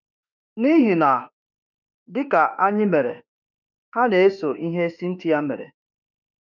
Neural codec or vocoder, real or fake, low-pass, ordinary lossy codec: autoencoder, 48 kHz, 32 numbers a frame, DAC-VAE, trained on Japanese speech; fake; 7.2 kHz; none